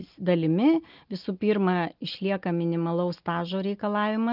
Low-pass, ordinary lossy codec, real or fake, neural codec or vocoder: 5.4 kHz; Opus, 32 kbps; real; none